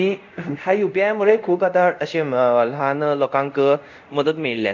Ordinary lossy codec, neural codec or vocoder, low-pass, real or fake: none; codec, 24 kHz, 0.5 kbps, DualCodec; 7.2 kHz; fake